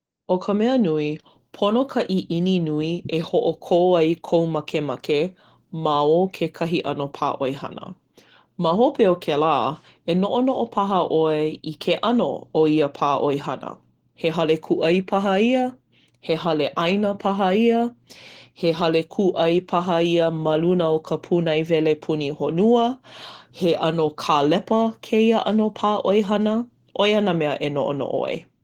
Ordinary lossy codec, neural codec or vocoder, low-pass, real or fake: Opus, 16 kbps; none; 19.8 kHz; real